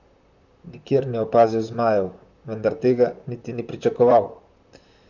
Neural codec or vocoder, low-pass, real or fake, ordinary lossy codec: vocoder, 44.1 kHz, 128 mel bands, Pupu-Vocoder; 7.2 kHz; fake; none